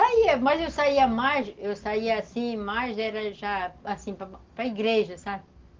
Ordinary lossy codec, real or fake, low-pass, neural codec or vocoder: Opus, 16 kbps; real; 7.2 kHz; none